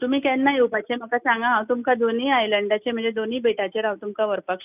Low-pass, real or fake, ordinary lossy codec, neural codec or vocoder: 3.6 kHz; real; none; none